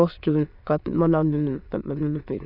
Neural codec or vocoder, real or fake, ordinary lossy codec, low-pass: autoencoder, 22.05 kHz, a latent of 192 numbers a frame, VITS, trained on many speakers; fake; none; 5.4 kHz